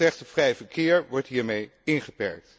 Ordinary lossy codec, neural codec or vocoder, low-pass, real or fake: none; none; none; real